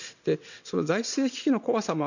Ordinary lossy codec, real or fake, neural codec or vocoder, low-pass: none; fake; vocoder, 22.05 kHz, 80 mel bands, WaveNeXt; 7.2 kHz